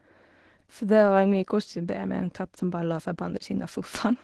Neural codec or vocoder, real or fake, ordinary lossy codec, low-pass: codec, 24 kHz, 0.9 kbps, WavTokenizer, medium speech release version 1; fake; Opus, 16 kbps; 10.8 kHz